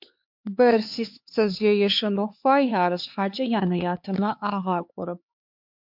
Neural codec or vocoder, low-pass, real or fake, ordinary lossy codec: codec, 16 kHz, 4 kbps, X-Codec, HuBERT features, trained on LibriSpeech; 5.4 kHz; fake; MP3, 48 kbps